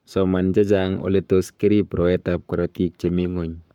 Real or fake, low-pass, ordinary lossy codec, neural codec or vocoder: fake; 19.8 kHz; MP3, 96 kbps; codec, 44.1 kHz, 7.8 kbps, Pupu-Codec